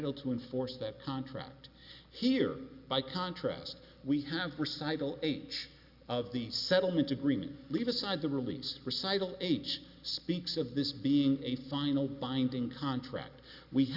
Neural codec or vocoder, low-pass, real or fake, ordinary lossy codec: none; 5.4 kHz; real; Opus, 64 kbps